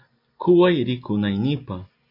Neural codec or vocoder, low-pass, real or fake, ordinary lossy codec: none; 5.4 kHz; real; MP3, 48 kbps